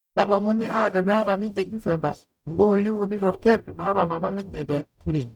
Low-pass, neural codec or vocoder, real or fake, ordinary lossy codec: 19.8 kHz; codec, 44.1 kHz, 0.9 kbps, DAC; fake; none